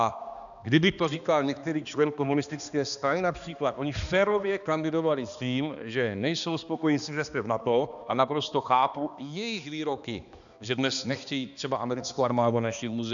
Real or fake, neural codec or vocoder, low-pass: fake; codec, 16 kHz, 2 kbps, X-Codec, HuBERT features, trained on balanced general audio; 7.2 kHz